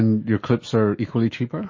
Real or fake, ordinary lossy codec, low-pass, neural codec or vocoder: fake; MP3, 32 kbps; 7.2 kHz; autoencoder, 48 kHz, 128 numbers a frame, DAC-VAE, trained on Japanese speech